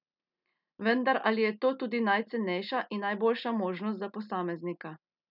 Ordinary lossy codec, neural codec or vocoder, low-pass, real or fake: none; none; 5.4 kHz; real